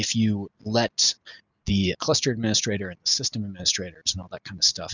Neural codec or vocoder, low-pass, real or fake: none; 7.2 kHz; real